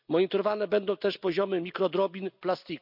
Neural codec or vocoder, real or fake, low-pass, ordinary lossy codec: none; real; 5.4 kHz; none